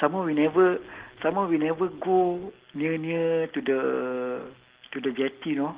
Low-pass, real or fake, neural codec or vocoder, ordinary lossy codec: 3.6 kHz; real; none; Opus, 16 kbps